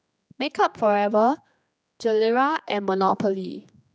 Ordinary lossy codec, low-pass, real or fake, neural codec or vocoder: none; none; fake; codec, 16 kHz, 2 kbps, X-Codec, HuBERT features, trained on general audio